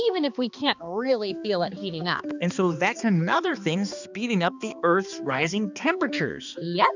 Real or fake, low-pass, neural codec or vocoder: fake; 7.2 kHz; codec, 16 kHz, 2 kbps, X-Codec, HuBERT features, trained on balanced general audio